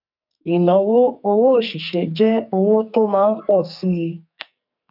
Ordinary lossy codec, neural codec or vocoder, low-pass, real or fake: none; codec, 44.1 kHz, 2.6 kbps, SNAC; 5.4 kHz; fake